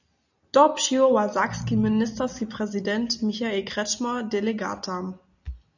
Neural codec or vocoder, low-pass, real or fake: none; 7.2 kHz; real